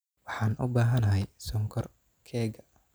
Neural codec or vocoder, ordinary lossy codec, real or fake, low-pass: none; none; real; none